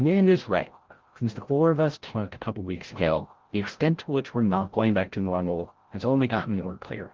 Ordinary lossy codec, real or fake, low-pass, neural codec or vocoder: Opus, 16 kbps; fake; 7.2 kHz; codec, 16 kHz, 0.5 kbps, FreqCodec, larger model